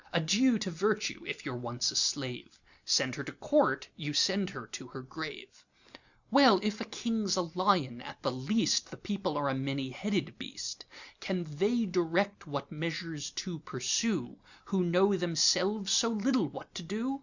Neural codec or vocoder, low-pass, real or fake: none; 7.2 kHz; real